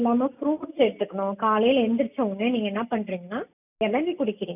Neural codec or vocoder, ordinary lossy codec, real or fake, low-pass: none; none; real; 3.6 kHz